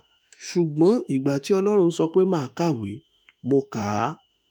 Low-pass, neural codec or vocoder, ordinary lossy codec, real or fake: 19.8 kHz; autoencoder, 48 kHz, 32 numbers a frame, DAC-VAE, trained on Japanese speech; none; fake